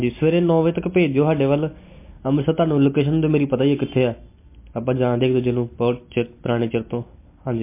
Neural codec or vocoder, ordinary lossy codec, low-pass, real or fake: none; MP3, 24 kbps; 3.6 kHz; real